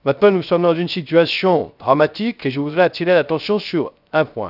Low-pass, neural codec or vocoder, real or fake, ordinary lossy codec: 5.4 kHz; codec, 16 kHz, 0.3 kbps, FocalCodec; fake; none